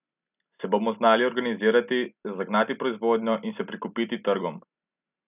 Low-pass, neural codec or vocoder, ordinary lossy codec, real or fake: 3.6 kHz; none; none; real